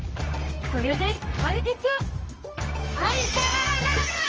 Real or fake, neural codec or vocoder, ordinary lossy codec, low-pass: fake; codec, 24 kHz, 0.9 kbps, WavTokenizer, medium music audio release; Opus, 24 kbps; 7.2 kHz